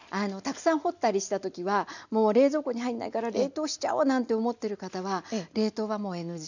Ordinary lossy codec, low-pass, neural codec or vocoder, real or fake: none; 7.2 kHz; none; real